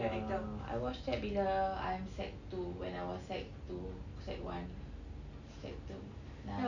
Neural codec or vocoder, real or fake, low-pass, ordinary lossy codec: none; real; 7.2 kHz; none